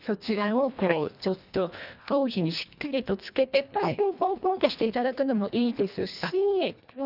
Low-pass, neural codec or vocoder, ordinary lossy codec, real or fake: 5.4 kHz; codec, 24 kHz, 1.5 kbps, HILCodec; none; fake